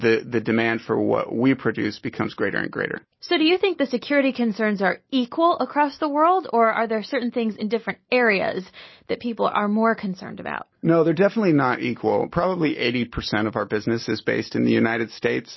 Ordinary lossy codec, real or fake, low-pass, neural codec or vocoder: MP3, 24 kbps; real; 7.2 kHz; none